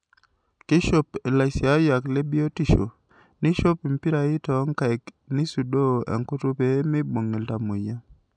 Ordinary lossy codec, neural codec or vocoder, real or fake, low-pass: none; none; real; 9.9 kHz